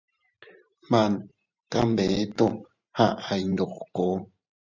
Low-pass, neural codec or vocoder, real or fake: 7.2 kHz; vocoder, 44.1 kHz, 128 mel bands every 512 samples, BigVGAN v2; fake